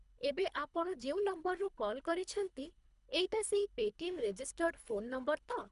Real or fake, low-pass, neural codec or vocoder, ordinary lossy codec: fake; 10.8 kHz; codec, 24 kHz, 3 kbps, HILCodec; none